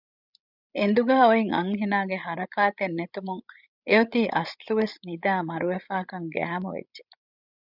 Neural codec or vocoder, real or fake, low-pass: codec, 16 kHz, 16 kbps, FreqCodec, larger model; fake; 5.4 kHz